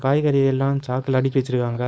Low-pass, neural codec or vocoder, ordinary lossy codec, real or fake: none; codec, 16 kHz, 4.8 kbps, FACodec; none; fake